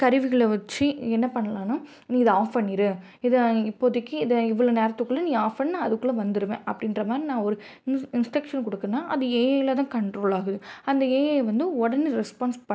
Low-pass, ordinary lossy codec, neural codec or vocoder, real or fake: none; none; none; real